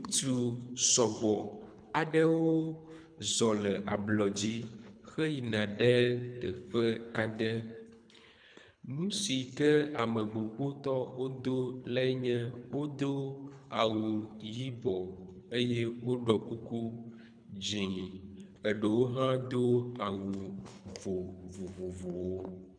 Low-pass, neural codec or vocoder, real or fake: 9.9 kHz; codec, 24 kHz, 3 kbps, HILCodec; fake